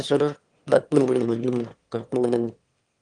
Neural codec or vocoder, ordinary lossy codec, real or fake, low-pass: autoencoder, 22.05 kHz, a latent of 192 numbers a frame, VITS, trained on one speaker; Opus, 24 kbps; fake; 9.9 kHz